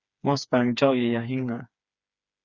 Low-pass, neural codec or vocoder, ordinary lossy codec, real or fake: 7.2 kHz; codec, 16 kHz, 4 kbps, FreqCodec, smaller model; Opus, 64 kbps; fake